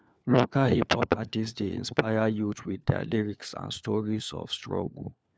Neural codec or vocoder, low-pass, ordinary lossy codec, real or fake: codec, 16 kHz, 4 kbps, FunCodec, trained on LibriTTS, 50 frames a second; none; none; fake